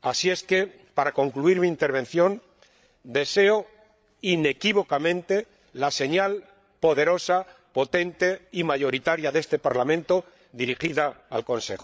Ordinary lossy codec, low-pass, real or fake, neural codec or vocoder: none; none; fake; codec, 16 kHz, 8 kbps, FreqCodec, larger model